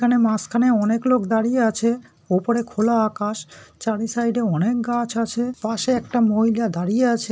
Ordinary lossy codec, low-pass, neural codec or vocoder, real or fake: none; none; none; real